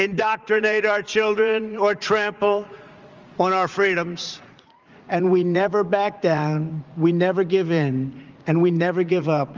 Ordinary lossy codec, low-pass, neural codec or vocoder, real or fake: Opus, 32 kbps; 7.2 kHz; vocoder, 44.1 kHz, 80 mel bands, Vocos; fake